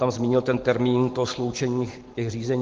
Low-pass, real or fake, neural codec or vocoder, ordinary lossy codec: 7.2 kHz; real; none; Opus, 16 kbps